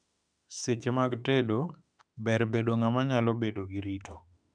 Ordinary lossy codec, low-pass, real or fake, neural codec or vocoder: none; 9.9 kHz; fake; autoencoder, 48 kHz, 32 numbers a frame, DAC-VAE, trained on Japanese speech